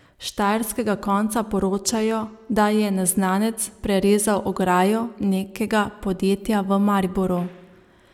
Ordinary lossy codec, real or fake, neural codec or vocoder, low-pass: none; real; none; 19.8 kHz